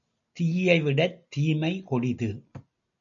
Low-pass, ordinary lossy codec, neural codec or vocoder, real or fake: 7.2 kHz; MP3, 64 kbps; none; real